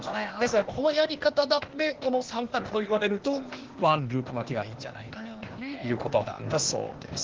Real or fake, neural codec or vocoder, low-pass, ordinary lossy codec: fake; codec, 16 kHz, 0.8 kbps, ZipCodec; 7.2 kHz; Opus, 16 kbps